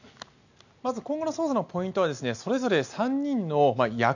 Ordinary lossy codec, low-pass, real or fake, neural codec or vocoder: none; 7.2 kHz; real; none